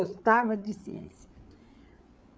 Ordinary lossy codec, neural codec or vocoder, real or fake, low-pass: none; codec, 16 kHz, 8 kbps, FreqCodec, larger model; fake; none